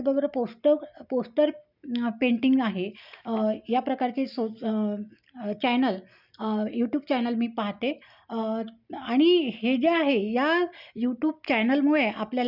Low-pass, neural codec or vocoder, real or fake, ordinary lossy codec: 5.4 kHz; none; real; none